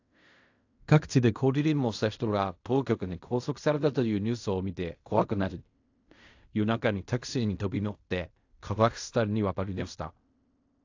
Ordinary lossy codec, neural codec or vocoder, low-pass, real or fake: AAC, 48 kbps; codec, 16 kHz in and 24 kHz out, 0.4 kbps, LongCat-Audio-Codec, fine tuned four codebook decoder; 7.2 kHz; fake